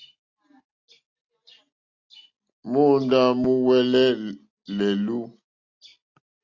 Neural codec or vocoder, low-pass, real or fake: none; 7.2 kHz; real